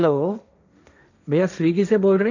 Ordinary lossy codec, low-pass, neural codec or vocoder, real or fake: none; 7.2 kHz; codec, 16 kHz, 1.1 kbps, Voila-Tokenizer; fake